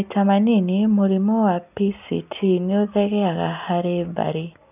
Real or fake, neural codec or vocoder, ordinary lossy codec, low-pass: real; none; none; 3.6 kHz